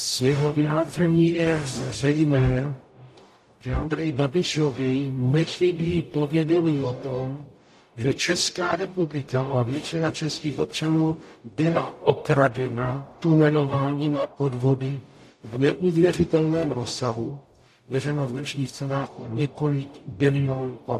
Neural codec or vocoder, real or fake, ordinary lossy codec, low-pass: codec, 44.1 kHz, 0.9 kbps, DAC; fake; AAC, 64 kbps; 14.4 kHz